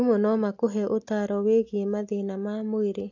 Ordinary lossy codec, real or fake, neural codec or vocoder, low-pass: AAC, 48 kbps; real; none; 7.2 kHz